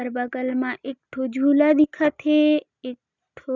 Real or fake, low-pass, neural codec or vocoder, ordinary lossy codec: real; 7.2 kHz; none; none